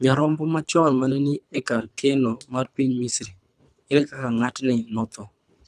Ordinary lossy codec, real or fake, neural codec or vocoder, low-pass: none; fake; codec, 24 kHz, 3 kbps, HILCodec; none